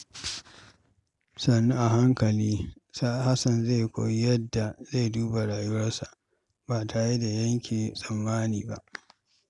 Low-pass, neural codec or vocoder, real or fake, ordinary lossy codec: 10.8 kHz; none; real; none